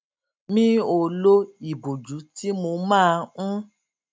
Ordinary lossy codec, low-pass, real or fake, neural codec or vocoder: none; none; real; none